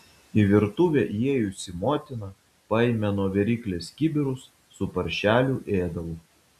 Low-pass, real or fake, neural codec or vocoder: 14.4 kHz; real; none